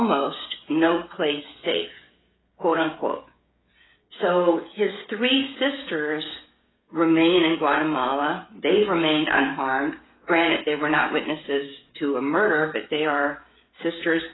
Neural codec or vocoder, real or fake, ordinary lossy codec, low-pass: codec, 16 kHz, 16 kbps, FreqCodec, smaller model; fake; AAC, 16 kbps; 7.2 kHz